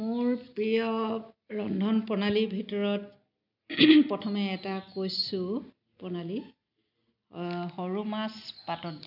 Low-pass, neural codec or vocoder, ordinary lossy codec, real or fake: 5.4 kHz; none; none; real